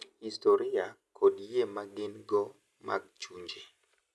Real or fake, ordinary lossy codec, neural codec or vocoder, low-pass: real; none; none; none